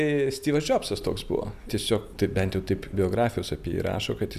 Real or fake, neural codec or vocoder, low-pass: real; none; 14.4 kHz